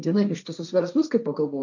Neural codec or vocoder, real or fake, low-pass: codec, 16 kHz, 1.1 kbps, Voila-Tokenizer; fake; 7.2 kHz